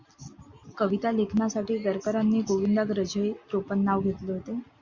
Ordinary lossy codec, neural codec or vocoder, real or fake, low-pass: Opus, 64 kbps; none; real; 7.2 kHz